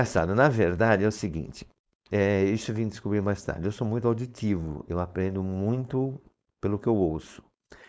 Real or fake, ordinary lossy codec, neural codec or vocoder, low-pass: fake; none; codec, 16 kHz, 4.8 kbps, FACodec; none